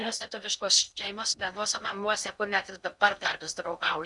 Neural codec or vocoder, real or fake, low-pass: codec, 16 kHz in and 24 kHz out, 0.6 kbps, FocalCodec, streaming, 4096 codes; fake; 10.8 kHz